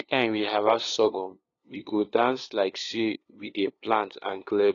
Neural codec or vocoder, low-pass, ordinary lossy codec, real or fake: codec, 16 kHz, 2 kbps, FunCodec, trained on LibriTTS, 25 frames a second; 7.2 kHz; AAC, 32 kbps; fake